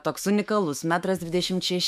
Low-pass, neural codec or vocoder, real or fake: 14.4 kHz; autoencoder, 48 kHz, 128 numbers a frame, DAC-VAE, trained on Japanese speech; fake